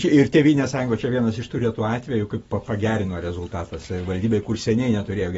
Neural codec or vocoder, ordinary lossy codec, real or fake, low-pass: none; AAC, 24 kbps; real; 19.8 kHz